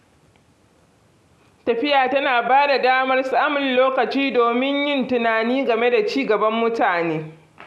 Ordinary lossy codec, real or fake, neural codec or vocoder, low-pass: none; real; none; none